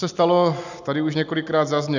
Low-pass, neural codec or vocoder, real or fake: 7.2 kHz; none; real